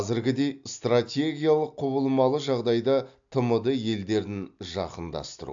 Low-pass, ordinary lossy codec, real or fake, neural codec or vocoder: 7.2 kHz; AAC, 64 kbps; real; none